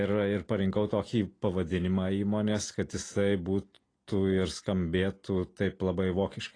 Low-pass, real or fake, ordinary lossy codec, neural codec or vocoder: 9.9 kHz; real; AAC, 32 kbps; none